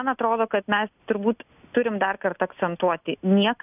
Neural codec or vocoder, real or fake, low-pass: none; real; 3.6 kHz